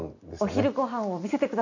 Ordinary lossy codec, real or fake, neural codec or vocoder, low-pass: none; real; none; 7.2 kHz